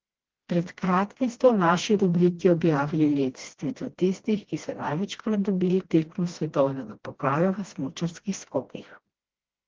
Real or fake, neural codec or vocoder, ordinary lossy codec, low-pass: fake; codec, 16 kHz, 1 kbps, FreqCodec, smaller model; Opus, 16 kbps; 7.2 kHz